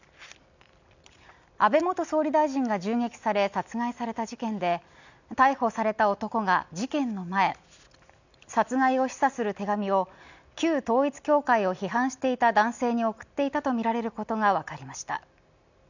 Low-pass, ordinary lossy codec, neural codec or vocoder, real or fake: 7.2 kHz; none; none; real